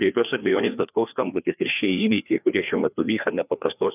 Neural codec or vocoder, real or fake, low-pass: codec, 16 kHz, 2 kbps, FreqCodec, larger model; fake; 3.6 kHz